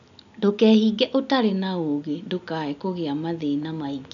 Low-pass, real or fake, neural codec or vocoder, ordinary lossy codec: 7.2 kHz; real; none; none